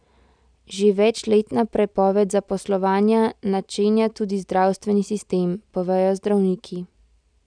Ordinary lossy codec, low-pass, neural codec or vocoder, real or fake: none; 9.9 kHz; none; real